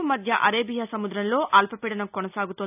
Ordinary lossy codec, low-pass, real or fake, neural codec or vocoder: none; 3.6 kHz; real; none